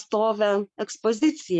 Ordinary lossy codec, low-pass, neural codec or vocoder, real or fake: MP3, 64 kbps; 10.8 kHz; codec, 44.1 kHz, 7.8 kbps, Pupu-Codec; fake